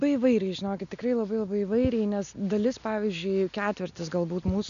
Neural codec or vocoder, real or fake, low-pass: none; real; 7.2 kHz